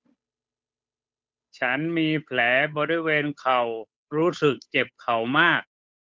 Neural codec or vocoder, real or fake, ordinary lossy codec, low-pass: codec, 16 kHz, 8 kbps, FunCodec, trained on Chinese and English, 25 frames a second; fake; none; none